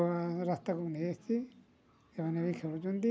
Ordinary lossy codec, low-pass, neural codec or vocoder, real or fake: none; none; none; real